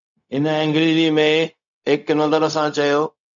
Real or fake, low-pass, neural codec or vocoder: fake; 7.2 kHz; codec, 16 kHz, 0.4 kbps, LongCat-Audio-Codec